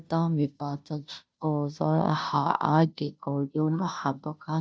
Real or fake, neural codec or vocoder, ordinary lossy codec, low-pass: fake; codec, 16 kHz, 0.5 kbps, FunCodec, trained on Chinese and English, 25 frames a second; none; none